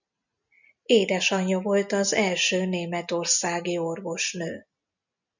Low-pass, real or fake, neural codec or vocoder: 7.2 kHz; real; none